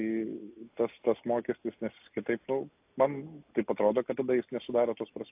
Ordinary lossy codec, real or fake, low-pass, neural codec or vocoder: AAC, 32 kbps; real; 3.6 kHz; none